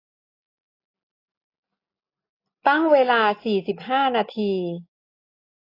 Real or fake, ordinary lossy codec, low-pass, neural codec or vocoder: real; AAC, 32 kbps; 5.4 kHz; none